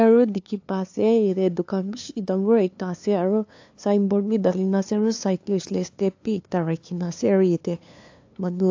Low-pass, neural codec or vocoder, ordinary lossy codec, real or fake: 7.2 kHz; codec, 16 kHz, 2 kbps, FunCodec, trained on LibriTTS, 25 frames a second; AAC, 48 kbps; fake